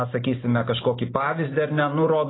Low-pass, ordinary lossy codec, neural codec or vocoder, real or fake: 7.2 kHz; AAC, 16 kbps; none; real